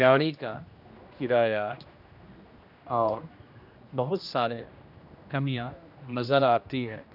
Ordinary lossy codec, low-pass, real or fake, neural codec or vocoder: none; 5.4 kHz; fake; codec, 16 kHz, 1 kbps, X-Codec, HuBERT features, trained on general audio